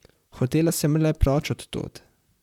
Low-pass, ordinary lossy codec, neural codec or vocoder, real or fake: 19.8 kHz; none; vocoder, 44.1 kHz, 128 mel bands, Pupu-Vocoder; fake